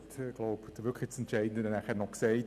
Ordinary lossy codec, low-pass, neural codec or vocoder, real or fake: none; 14.4 kHz; none; real